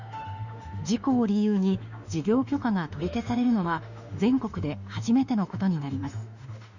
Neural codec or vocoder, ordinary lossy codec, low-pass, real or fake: autoencoder, 48 kHz, 32 numbers a frame, DAC-VAE, trained on Japanese speech; none; 7.2 kHz; fake